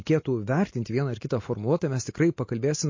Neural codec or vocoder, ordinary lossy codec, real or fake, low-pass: none; MP3, 32 kbps; real; 7.2 kHz